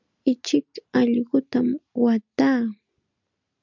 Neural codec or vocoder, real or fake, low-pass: none; real; 7.2 kHz